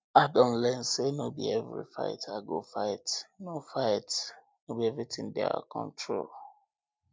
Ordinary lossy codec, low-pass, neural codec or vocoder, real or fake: none; none; none; real